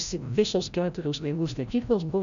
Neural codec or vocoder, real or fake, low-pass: codec, 16 kHz, 0.5 kbps, FreqCodec, larger model; fake; 7.2 kHz